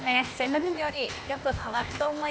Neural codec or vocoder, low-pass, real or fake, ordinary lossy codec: codec, 16 kHz, 0.8 kbps, ZipCodec; none; fake; none